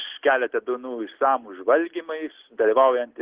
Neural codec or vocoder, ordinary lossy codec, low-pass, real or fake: none; Opus, 16 kbps; 3.6 kHz; real